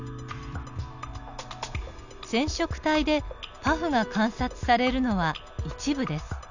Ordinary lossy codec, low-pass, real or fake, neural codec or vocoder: none; 7.2 kHz; real; none